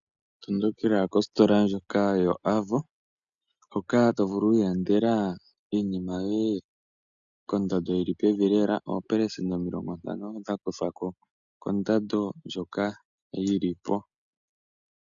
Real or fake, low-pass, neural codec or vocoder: real; 7.2 kHz; none